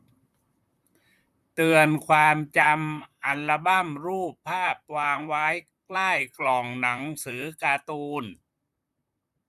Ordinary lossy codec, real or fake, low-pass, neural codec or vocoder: none; fake; 14.4 kHz; vocoder, 44.1 kHz, 128 mel bands, Pupu-Vocoder